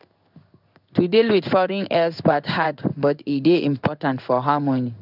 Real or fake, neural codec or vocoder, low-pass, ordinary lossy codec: fake; codec, 16 kHz in and 24 kHz out, 1 kbps, XY-Tokenizer; 5.4 kHz; none